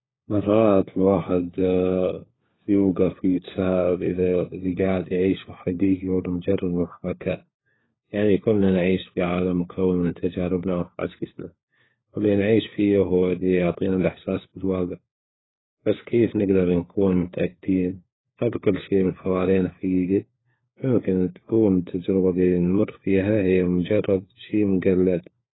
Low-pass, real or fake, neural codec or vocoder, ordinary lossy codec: 7.2 kHz; fake; codec, 16 kHz, 4 kbps, FunCodec, trained on LibriTTS, 50 frames a second; AAC, 16 kbps